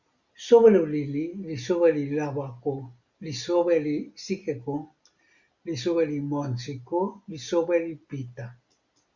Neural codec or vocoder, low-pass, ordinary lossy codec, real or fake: none; 7.2 kHz; Opus, 64 kbps; real